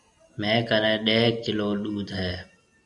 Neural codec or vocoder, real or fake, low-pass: none; real; 10.8 kHz